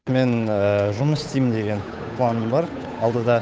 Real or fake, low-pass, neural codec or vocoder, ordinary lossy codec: fake; none; codec, 16 kHz, 8 kbps, FunCodec, trained on Chinese and English, 25 frames a second; none